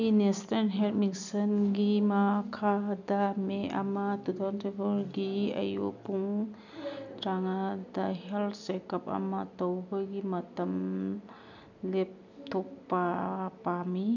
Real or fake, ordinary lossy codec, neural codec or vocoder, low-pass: real; none; none; 7.2 kHz